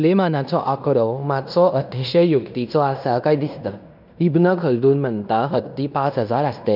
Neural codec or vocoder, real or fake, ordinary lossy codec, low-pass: codec, 16 kHz in and 24 kHz out, 0.9 kbps, LongCat-Audio-Codec, fine tuned four codebook decoder; fake; none; 5.4 kHz